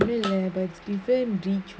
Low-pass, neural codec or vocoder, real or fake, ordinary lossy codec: none; none; real; none